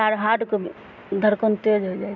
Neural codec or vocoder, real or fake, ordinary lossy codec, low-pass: none; real; none; 7.2 kHz